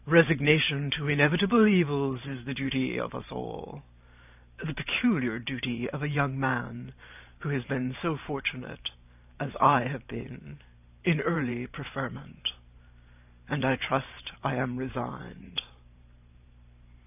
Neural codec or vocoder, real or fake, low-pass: vocoder, 44.1 kHz, 128 mel bands every 512 samples, BigVGAN v2; fake; 3.6 kHz